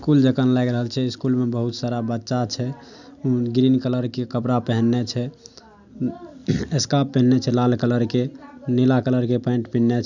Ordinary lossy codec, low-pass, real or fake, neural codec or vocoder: none; 7.2 kHz; real; none